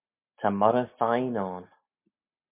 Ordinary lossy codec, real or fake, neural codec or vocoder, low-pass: MP3, 32 kbps; real; none; 3.6 kHz